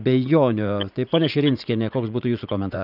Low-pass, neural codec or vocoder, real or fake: 5.4 kHz; vocoder, 44.1 kHz, 80 mel bands, Vocos; fake